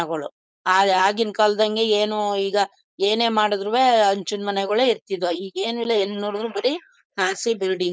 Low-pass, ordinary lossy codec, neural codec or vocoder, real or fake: none; none; codec, 16 kHz, 4.8 kbps, FACodec; fake